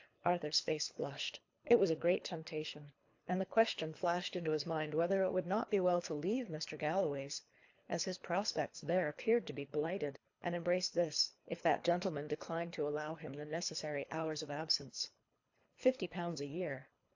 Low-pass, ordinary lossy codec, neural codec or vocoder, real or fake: 7.2 kHz; AAC, 48 kbps; codec, 24 kHz, 3 kbps, HILCodec; fake